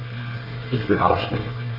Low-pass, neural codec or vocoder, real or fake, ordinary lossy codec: 5.4 kHz; codec, 24 kHz, 1 kbps, SNAC; fake; Opus, 24 kbps